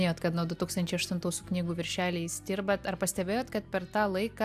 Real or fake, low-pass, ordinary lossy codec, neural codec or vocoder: real; 14.4 kHz; AAC, 96 kbps; none